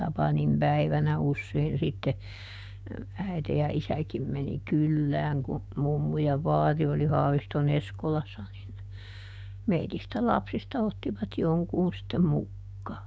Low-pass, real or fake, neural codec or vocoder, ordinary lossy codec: none; fake; codec, 16 kHz, 6 kbps, DAC; none